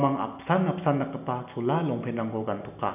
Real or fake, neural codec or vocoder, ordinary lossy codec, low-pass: real; none; none; 3.6 kHz